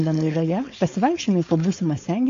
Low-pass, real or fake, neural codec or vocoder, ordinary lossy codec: 7.2 kHz; fake; codec, 16 kHz, 4.8 kbps, FACodec; AAC, 48 kbps